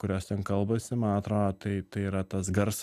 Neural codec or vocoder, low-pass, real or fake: none; 14.4 kHz; real